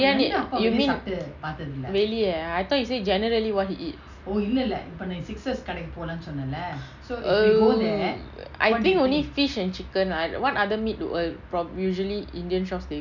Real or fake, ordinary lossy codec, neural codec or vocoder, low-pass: real; none; none; 7.2 kHz